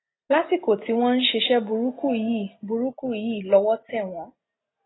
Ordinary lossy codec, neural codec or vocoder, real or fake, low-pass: AAC, 16 kbps; none; real; 7.2 kHz